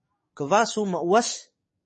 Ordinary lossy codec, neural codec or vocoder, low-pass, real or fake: MP3, 32 kbps; codec, 44.1 kHz, 7.8 kbps, DAC; 10.8 kHz; fake